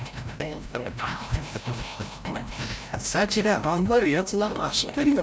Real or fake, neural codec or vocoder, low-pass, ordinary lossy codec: fake; codec, 16 kHz, 0.5 kbps, FreqCodec, larger model; none; none